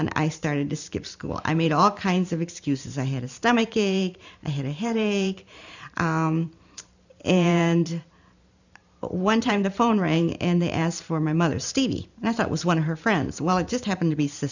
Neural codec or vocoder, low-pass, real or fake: vocoder, 44.1 kHz, 128 mel bands every 512 samples, BigVGAN v2; 7.2 kHz; fake